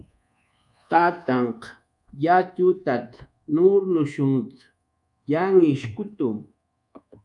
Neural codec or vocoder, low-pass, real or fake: codec, 24 kHz, 1.2 kbps, DualCodec; 10.8 kHz; fake